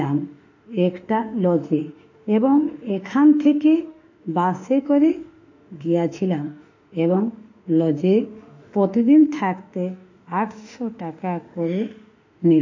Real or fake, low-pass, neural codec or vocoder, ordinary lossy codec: fake; 7.2 kHz; autoencoder, 48 kHz, 32 numbers a frame, DAC-VAE, trained on Japanese speech; AAC, 48 kbps